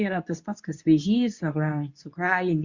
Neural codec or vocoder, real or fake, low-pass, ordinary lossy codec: codec, 24 kHz, 0.9 kbps, WavTokenizer, medium speech release version 2; fake; 7.2 kHz; Opus, 64 kbps